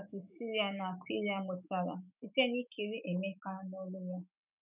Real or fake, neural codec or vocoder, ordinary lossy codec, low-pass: fake; autoencoder, 48 kHz, 128 numbers a frame, DAC-VAE, trained on Japanese speech; none; 3.6 kHz